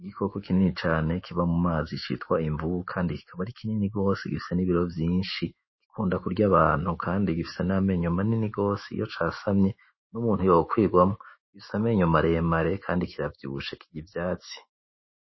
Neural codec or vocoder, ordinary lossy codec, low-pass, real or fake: autoencoder, 48 kHz, 128 numbers a frame, DAC-VAE, trained on Japanese speech; MP3, 24 kbps; 7.2 kHz; fake